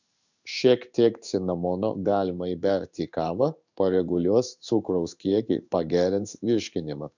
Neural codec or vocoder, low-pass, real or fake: codec, 16 kHz in and 24 kHz out, 1 kbps, XY-Tokenizer; 7.2 kHz; fake